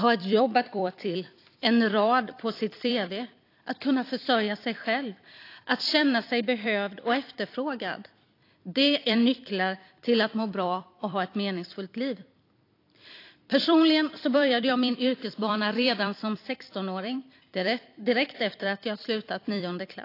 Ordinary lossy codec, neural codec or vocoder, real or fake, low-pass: AAC, 32 kbps; vocoder, 44.1 kHz, 128 mel bands every 256 samples, BigVGAN v2; fake; 5.4 kHz